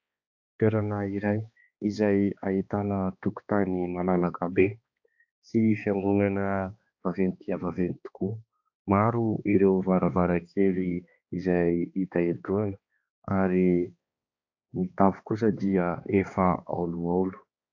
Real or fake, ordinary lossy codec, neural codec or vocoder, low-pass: fake; AAC, 48 kbps; codec, 16 kHz, 2 kbps, X-Codec, HuBERT features, trained on balanced general audio; 7.2 kHz